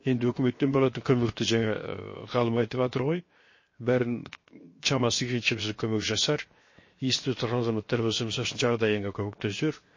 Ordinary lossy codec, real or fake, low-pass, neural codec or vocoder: MP3, 32 kbps; fake; 7.2 kHz; codec, 16 kHz, 0.7 kbps, FocalCodec